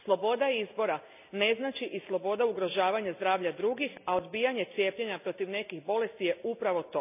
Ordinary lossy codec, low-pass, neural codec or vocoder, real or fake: none; 3.6 kHz; none; real